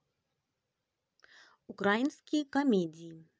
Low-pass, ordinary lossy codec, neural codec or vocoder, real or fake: none; none; none; real